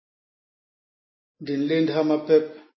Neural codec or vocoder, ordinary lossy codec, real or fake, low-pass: none; MP3, 24 kbps; real; 7.2 kHz